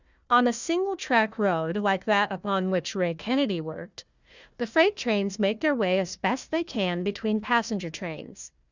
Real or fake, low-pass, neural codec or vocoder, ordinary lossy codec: fake; 7.2 kHz; codec, 16 kHz, 1 kbps, FunCodec, trained on Chinese and English, 50 frames a second; Opus, 64 kbps